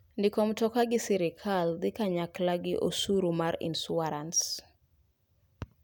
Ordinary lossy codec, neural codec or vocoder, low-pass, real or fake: none; none; none; real